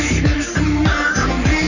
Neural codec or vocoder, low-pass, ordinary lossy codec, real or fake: codec, 44.1 kHz, 3.4 kbps, Pupu-Codec; 7.2 kHz; none; fake